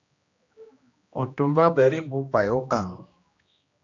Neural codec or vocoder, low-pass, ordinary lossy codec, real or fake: codec, 16 kHz, 1 kbps, X-Codec, HuBERT features, trained on general audio; 7.2 kHz; AAC, 48 kbps; fake